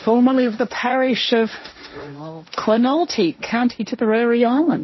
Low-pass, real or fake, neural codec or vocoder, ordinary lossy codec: 7.2 kHz; fake; codec, 16 kHz, 1.1 kbps, Voila-Tokenizer; MP3, 24 kbps